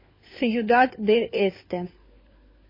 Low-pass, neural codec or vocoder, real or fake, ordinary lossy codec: 5.4 kHz; codec, 16 kHz, 4 kbps, FunCodec, trained on LibriTTS, 50 frames a second; fake; MP3, 24 kbps